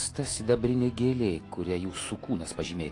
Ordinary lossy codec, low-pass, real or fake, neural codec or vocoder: AAC, 48 kbps; 10.8 kHz; fake; autoencoder, 48 kHz, 128 numbers a frame, DAC-VAE, trained on Japanese speech